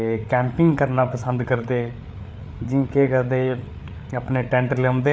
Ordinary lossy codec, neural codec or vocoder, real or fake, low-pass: none; codec, 16 kHz, 8 kbps, FreqCodec, larger model; fake; none